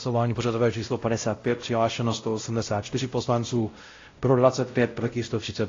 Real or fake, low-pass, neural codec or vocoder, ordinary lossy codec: fake; 7.2 kHz; codec, 16 kHz, 0.5 kbps, X-Codec, WavLM features, trained on Multilingual LibriSpeech; AAC, 32 kbps